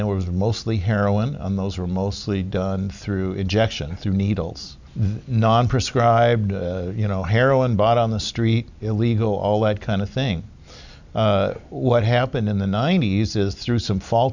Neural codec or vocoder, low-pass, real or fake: none; 7.2 kHz; real